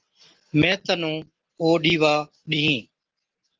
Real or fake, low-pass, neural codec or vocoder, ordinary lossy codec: real; 7.2 kHz; none; Opus, 16 kbps